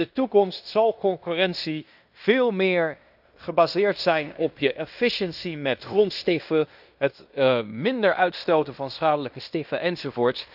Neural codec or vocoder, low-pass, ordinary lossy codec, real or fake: codec, 16 kHz in and 24 kHz out, 0.9 kbps, LongCat-Audio-Codec, fine tuned four codebook decoder; 5.4 kHz; none; fake